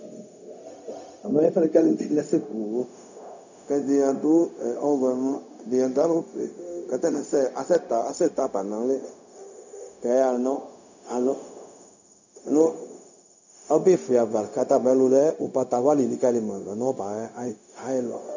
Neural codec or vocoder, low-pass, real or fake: codec, 16 kHz, 0.4 kbps, LongCat-Audio-Codec; 7.2 kHz; fake